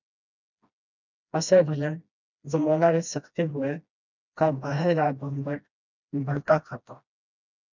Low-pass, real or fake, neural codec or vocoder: 7.2 kHz; fake; codec, 16 kHz, 1 kbps, FreqCodec, smaller model